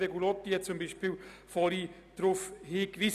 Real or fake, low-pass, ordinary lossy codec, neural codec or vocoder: real; 14.4 kHz; none; none